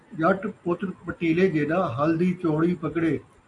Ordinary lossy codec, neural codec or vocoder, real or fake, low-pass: AAC, 48 kbps; none; real; 10.8 kHz